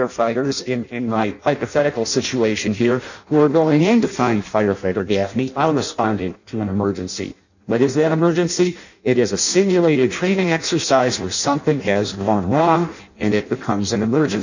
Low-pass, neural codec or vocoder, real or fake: 7.2 kHz; codec, 16 kHz in and 24 kHz out, 0.6 kbps, FireRedTTS-2 codec; fake